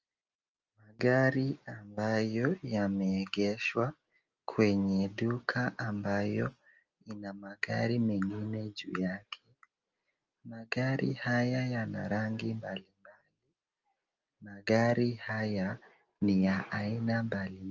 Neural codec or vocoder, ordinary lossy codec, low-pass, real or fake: none; Opus, 24 kbps; 7.2 kHz; real